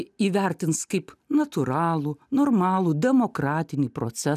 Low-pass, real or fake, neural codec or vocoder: 14.4 kHz; real; none